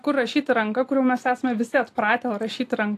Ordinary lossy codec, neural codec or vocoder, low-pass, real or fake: AAC, 64 kbps; none; 14.4 kHz; real